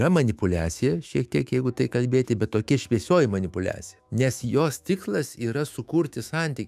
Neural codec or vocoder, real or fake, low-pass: autoencoder, 48 kHz, 128 numbers a frame, DAC-VAE, trained on Japanese speech; fake; 14.4 kHz